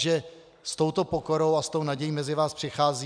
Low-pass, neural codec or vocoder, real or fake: 9.9 kHz; none; real